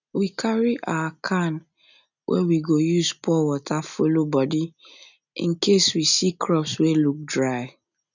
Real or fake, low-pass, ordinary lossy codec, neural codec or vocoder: real; 7.2 kHz; none; none